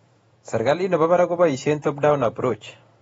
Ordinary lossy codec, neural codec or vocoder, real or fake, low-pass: AAC, 24 kbps; vocoder, 48 kHz, 128 mel bands, Vocos; fake; 19.8 kHz